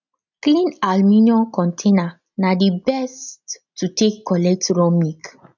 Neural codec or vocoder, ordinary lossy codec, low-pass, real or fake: none; none; 7.2 kHz; real